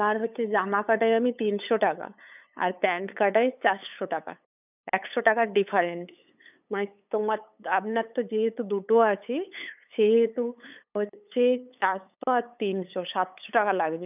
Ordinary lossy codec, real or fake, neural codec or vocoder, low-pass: none; fake; codec, 16 kHz, 8 kbps, FunCodec, trained on LibriTTS, 25 frames a second; 3.6 kHz